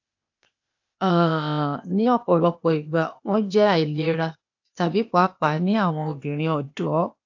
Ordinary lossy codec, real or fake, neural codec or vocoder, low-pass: AAC, 48 kbps; fake; codec, 16 kHz, 0.8 kbps, ZipCodec; 7.2 kHz